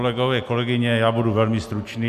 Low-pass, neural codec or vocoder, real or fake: 14.4 kHz; none; real